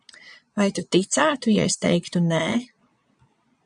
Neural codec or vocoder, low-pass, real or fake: vocoder, 22.05 kHz, 80 mel bands, Vocos; 9.9 kHz; fake